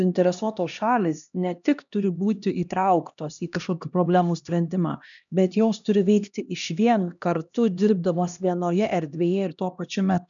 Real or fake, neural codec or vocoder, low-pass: fake; codec, 16 kHz, 1 kbps, X-Codec, HuBERT features, trained on LibriSpeech; 7.2 kHz